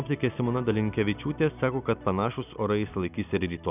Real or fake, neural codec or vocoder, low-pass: real; none; 3.6 kHz